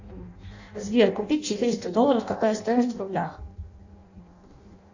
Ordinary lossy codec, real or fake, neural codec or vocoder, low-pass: Opus, 64 kbps; fake; codec, 16 kHz in and 24 kHz out, 0.6 kbps, FireRedTTS-2 codec; 7.2 kHz